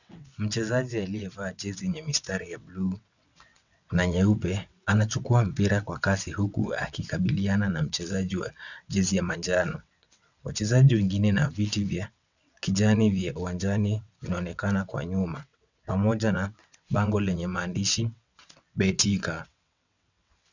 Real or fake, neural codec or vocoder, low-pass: fake; vocoder, 22.05 kHz, 80 mel bands, WaveNeXt; 7.2 kHz